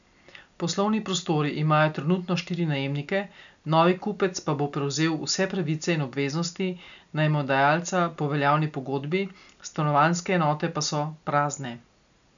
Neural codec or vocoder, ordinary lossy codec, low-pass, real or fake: none; none; 7.2 kHz; real